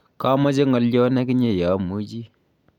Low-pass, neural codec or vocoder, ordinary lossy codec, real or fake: 19.8 kHz; none; none; real